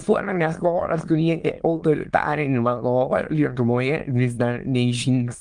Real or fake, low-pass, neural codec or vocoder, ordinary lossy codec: fake; 9.9 kHz; autoencoder, 22.05 kHz, a latent of 192 numbers a frame, VITS, trained on many speakers; Opus, 24 kbps